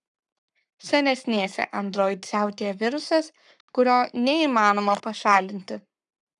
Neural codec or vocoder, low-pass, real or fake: codec, 44.1 kHz, 7.8 kbps, Pupu-Codec; 10.8 kHz; fake